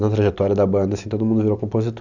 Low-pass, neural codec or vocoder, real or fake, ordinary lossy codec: 7.2 kHz; vocoder, 44.1 kHz, 128 mel bands every 256 samples, BigVGAN v2; fake; none